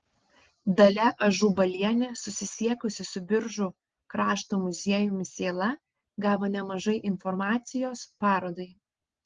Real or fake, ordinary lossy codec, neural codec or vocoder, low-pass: real; Opus, 16 kbps; none; 7.2 kHz